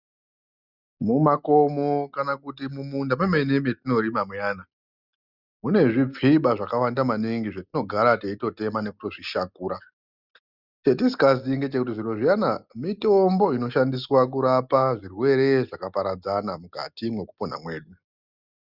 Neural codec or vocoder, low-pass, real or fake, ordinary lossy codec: none; 5.4 kHz; real; Opus, 64 kbps